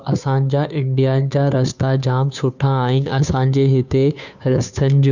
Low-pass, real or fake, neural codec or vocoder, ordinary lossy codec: 7.2 kHz; fake; codec, 16 kHz, 2 kbps, FunCodec, trained on Chinese and English, 25 frames a second; none